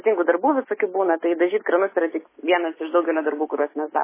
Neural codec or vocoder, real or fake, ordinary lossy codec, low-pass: none; real; MP3, 16 kbps; 3.6 kHz